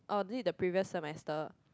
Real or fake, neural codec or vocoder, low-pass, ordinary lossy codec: real; none; none; none